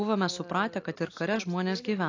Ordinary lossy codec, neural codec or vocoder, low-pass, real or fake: AAC, 48 kbps; none; 7.2 kHz; real